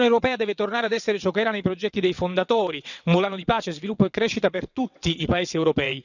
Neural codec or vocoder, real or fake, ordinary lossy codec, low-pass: vocoder, 22.05 kHz, 80 mel bands, WaveNeXt; fake; none; 7.2 kHz